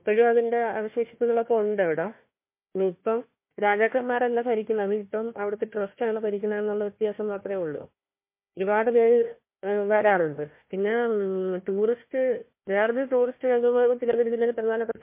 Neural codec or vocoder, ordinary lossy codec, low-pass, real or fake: codec, 16 kHz, 1 kbps, FunCodec, trained on Chinese and English, 50 frames a second; MP3, 24 kbps; 3.6 kHz; fake